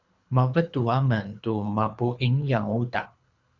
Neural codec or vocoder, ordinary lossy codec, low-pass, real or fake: codec, 24 kHz, 3 kbps, HILCodec; Opus, 64 kbps; 7.2 kHz; fake